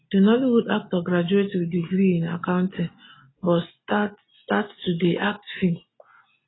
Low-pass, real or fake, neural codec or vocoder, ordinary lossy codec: 7.2 kHz; real; none; AAC, 16 kbps